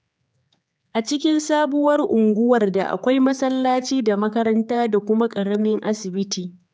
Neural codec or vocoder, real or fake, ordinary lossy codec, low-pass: codec, 16 kHz, 4 kbps, X-Codec, HuBERT features, trained on general audio; fake; none; none